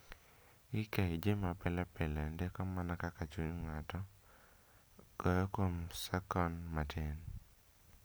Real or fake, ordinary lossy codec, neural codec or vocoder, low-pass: real; none; none; none